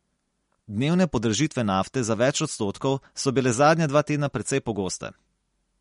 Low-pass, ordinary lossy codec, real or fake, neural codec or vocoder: 10.8 kHz; MP3, 48 kbps; real; none